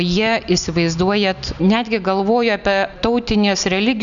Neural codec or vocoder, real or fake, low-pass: none; real; 7.2 kHz